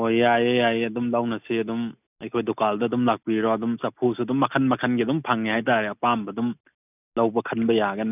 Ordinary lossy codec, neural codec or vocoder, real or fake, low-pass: none; none; real; 3.6 kHz